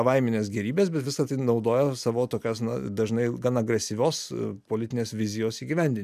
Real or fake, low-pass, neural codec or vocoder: real; 14.4 kHz; none